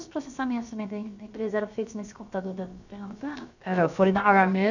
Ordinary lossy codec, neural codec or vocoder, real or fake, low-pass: none; codec, 16 kHz, about 1 kbps, DyCAST, with the encoder's durations; fake; 7.2 kHz